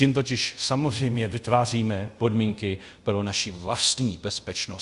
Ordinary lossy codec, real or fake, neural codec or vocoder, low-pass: Opus, 64 kbps; fake; codec, 24 kHz, 0.5 kbps, DualCodec; 10.8 kHz